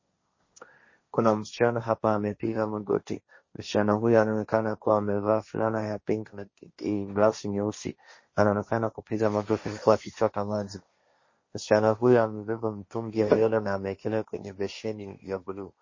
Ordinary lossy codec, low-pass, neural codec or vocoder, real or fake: MP3, 32 kbps; 7.2 kHz; codec, 16 kHz, 1.1 kbps, Voila-Tokenizer; fake